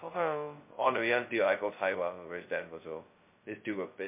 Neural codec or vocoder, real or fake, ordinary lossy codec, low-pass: codec, 16 kHz, 0.2 kbps, FocalCodec; fake; MP3, 24 kbps; 3.6 kHz